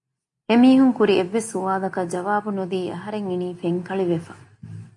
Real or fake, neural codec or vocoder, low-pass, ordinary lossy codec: real; none; 10.8 kHz; MP3, 48 kbps